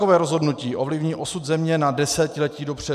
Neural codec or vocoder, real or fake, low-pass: none; real; 14.4 kHz